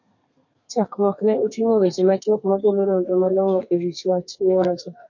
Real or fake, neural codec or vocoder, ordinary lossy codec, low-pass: fake; codec, 32 kHz, 1.9 kbps, SNAC; MP3, 48 kbps; 7.2 kHz